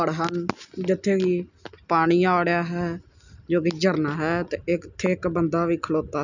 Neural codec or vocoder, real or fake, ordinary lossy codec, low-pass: none; real; none; 7.2 kHz